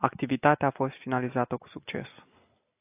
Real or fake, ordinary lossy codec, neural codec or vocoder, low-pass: real; AAC, 24 kbps; none; 3.6 kHz